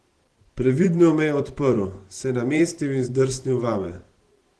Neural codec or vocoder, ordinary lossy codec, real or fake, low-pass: vocoder, 44.1 kHz, 128 mel bands every 512 samples, BigVGAN v2; Opus, 16 kbps; fake; 10.8 kHz